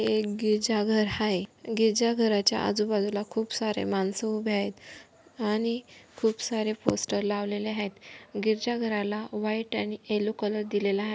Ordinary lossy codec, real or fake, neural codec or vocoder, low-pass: none; real; none; none